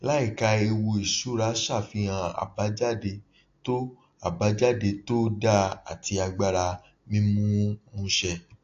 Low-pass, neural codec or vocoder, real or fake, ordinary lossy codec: 7.2 kHz; none; real; AAC, 48 kbps